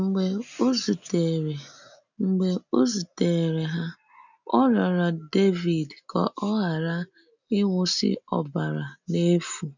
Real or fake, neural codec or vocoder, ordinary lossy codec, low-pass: real; none; none; 7.2 kHz